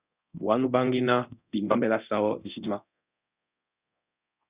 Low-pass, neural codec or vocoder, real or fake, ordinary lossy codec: 3.6 kHz; codec, 24 kHz, 0.9 kbps, DualCodec; fake; Opus, 64 kbps